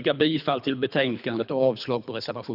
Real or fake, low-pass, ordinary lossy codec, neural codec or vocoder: fake; 5.4 kHz; none; codec, 24 kHz, 3 kbps, HILCodec